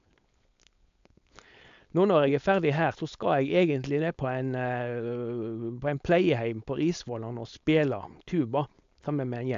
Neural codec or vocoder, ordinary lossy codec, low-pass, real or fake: codec, 16 kHz, 4.8 kbps, FACodec; AAC, 64 kbps; 7.2 kHz; fake